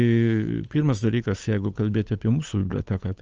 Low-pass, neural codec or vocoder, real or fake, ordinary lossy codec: 7.2 kHz; codec, 16 kHz, 8 kbps, FunCodec, trained on Chinese and English, 25 frames a second; fake; Opus, 24 kbps